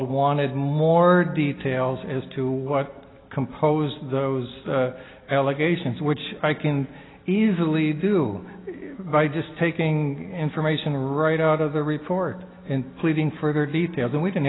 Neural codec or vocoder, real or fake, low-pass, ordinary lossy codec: none; real; 7.2 kHz; AAC, 16 kbps